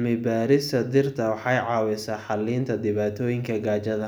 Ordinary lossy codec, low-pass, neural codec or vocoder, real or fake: none; none; none; real